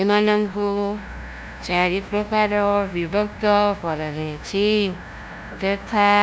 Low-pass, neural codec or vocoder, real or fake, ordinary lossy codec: none; codec, 16 kHz, 0.5 kbps, FunCodec, trained on LibriTTS, 25 frames a second; fake; none